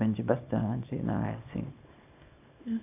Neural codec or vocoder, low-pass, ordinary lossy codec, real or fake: codec, 24 kHz, 0.9 kbps, WavTokenizer, small release; 3.6 kHz; AAC, 32 kbps; fake